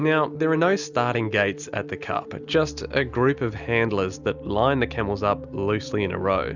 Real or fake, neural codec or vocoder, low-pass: real; none; 7.2 kHz